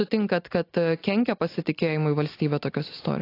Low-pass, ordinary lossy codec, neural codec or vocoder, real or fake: 5.4 kHz; AAC, 32 kbps; none; real